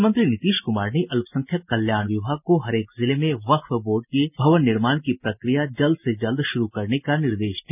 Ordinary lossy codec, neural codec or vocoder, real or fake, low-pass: none; none; real; 3.6 kHz